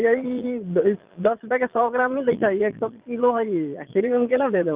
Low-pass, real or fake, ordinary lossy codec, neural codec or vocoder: 3.6 kHz; fake; Opus, 24 kbps; vocoder, 22.05 kHz, 80 mel bands, Vocos